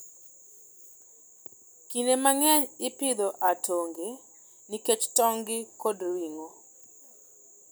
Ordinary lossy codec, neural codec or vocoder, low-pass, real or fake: none; none; none; real